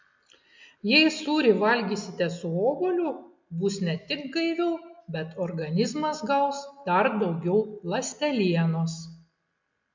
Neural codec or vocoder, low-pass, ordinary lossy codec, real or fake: none; 7.2 kHz; MP3, 64 kbps; real